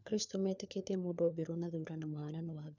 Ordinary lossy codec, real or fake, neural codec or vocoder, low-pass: none; fake; codec, 16 kHz, 4 kbps, FunCodec, trained on LibriTTS, 50 frames a second; 7.2 kHz